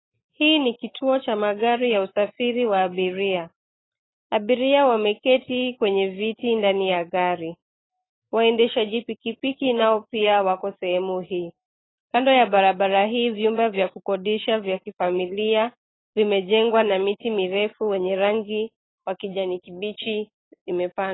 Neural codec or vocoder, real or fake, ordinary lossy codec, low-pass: none; real; AAC, 16 kbps; 7.2 kHz